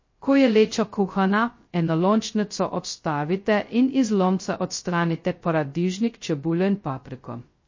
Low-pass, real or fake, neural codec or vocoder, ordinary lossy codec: 7.2 kHz; fake; codec, 16 kHz, 0.2 kbps, FocalCodec; MP3, 32 kbps